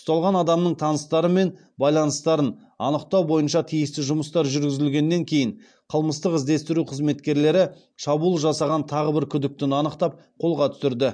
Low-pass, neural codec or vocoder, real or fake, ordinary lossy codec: 9.9 kHz; none; real; MP3, 64 kbps